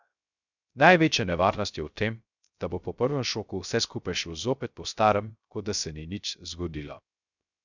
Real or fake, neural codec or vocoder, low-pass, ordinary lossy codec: fake; codec, 16 kHz, 0.3 kbps, FocalCodec; 7.2 kHz; none